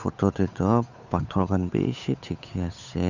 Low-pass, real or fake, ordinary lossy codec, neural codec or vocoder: 7.2 kHz; fake; Opus, 64 kbps; codec, 16 kHz, 8 kbps, FunCodec, trained on Chinese and English, 25 frames a second